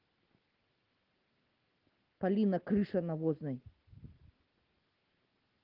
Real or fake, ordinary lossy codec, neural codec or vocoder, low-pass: real; Opus, 16 kbps; none; 5.4 kHz